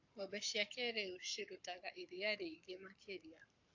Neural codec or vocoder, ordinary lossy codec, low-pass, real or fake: codec, 44.1 kHz, 7.8 kbps, Pupu-Codec; AAC, 48 kbps; 7.2 kHz; fake